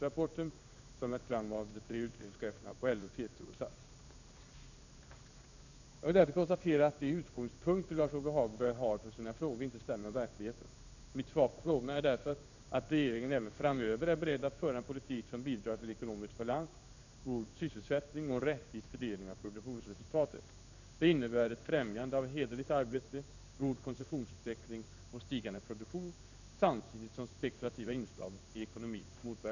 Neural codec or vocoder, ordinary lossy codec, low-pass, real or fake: codec, 16 kHz in and 24 kHz out, 1 kbps, XY-Tokenizer; none; 7.2 kHz; fake